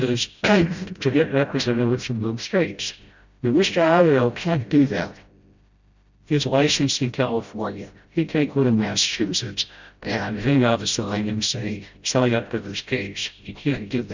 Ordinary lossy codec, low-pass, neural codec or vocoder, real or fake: Opus, 64 kbps; 7.2 kHz; codec, 16 kHz, 0.5 kbps, FreqCodec, smaller model; fake